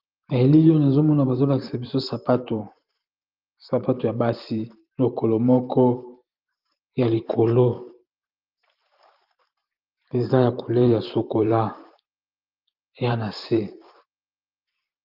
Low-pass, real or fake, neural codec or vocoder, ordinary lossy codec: 5.4 kHz; fake; vocoder, 44.1 kHz, 128 mel bands every 512 samples, BigVGAN v2; Opus, 32 kbps